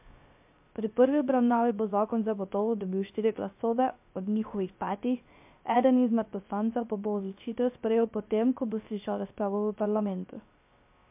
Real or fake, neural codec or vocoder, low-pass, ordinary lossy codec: fake; codec, 16 kHz, 0.3 kbps, FocalCodec; 3.6 kHz; MP3, 32 kbps